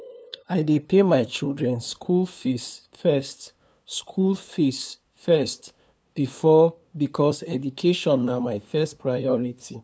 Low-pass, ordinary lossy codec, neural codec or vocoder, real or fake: none; none; codec, 16 kHz, 2 kbps, FunCodec, trained on LibriTTS, 25 frames a second; fake